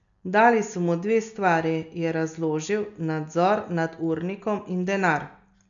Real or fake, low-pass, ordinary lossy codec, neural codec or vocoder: real; 7.2 kHz; none; none